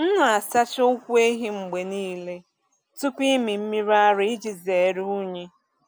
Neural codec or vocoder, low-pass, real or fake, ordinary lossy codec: none; none; real; none